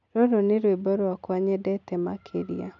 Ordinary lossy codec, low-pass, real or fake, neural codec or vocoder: none; 7.2 kHz; real; none